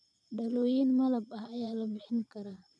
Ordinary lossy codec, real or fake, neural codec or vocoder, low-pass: none; fake; vocoder, 22.05 kHz, 80 mel bands, Vocos; none